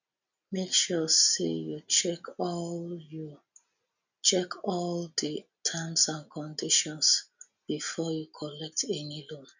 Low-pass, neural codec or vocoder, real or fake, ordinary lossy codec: 7.2 kHz; none; real; none